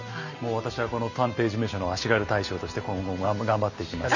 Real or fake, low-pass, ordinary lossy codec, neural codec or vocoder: real; 7.2 kHz; none; none